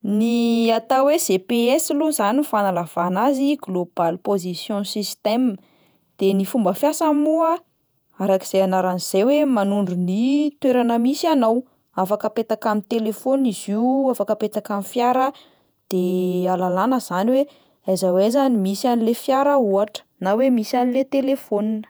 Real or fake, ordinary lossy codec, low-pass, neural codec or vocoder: fake; none; none; vocoder, 48 kHz, 128 mel bands, Vocos